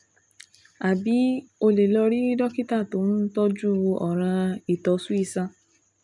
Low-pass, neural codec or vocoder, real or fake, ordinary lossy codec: 10.8 kHz; none; real; none